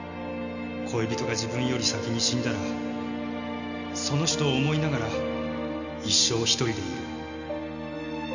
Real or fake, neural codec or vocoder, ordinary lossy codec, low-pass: real; none; none; 7.2 kHz